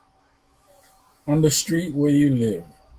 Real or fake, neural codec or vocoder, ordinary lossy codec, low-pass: fake; autoencoder, 48 kHz, 128 numbers a frame, DAC-VAE, trained on Japanese speech; Opus, 32 kbps; 14.4 kHz